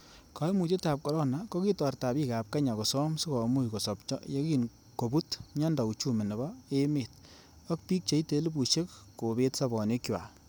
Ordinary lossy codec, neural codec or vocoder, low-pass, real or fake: none; none; none; real